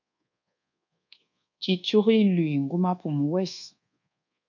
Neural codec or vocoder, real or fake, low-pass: codec, 24 kHz, 1.2 kbps, DualCodec; fake; 7.2 kHz